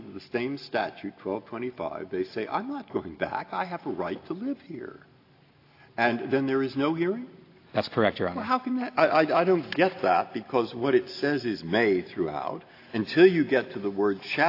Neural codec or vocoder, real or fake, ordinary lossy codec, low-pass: none; real; AAC, 32 kbps; 5.4 kHz